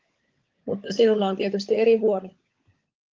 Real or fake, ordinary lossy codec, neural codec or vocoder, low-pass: fake; Opus, 32 kbps; codec, 16 kHz, 16 kbps, FunCodec, trained on LibriTTS, 50 frames a second; 7.2 kHz